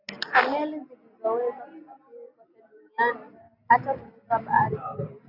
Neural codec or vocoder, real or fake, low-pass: none; real; 5.4 kHz